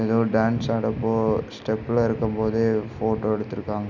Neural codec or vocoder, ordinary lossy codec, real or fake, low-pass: none; none; real; 7.2 kHz